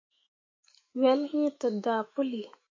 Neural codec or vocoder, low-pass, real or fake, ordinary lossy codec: autoencoder, 48 kHz, 128 numbers a frame, DAC-VAE, trained on Japanese speech; 7.2 kHz; fake; MP3, 32 kbps